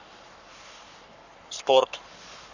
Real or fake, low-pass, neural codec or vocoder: fake; 7.2 kHz; codec, 44.1 kHz, 7.8 kbps, Pupu-Codec